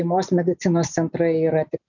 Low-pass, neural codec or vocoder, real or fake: 7.2 kHz; none; real